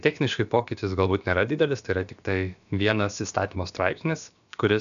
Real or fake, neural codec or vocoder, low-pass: fake; codec, 16 kHz, about 1 kbps, DyCAST, with the encoder's durations; 7.2 kHz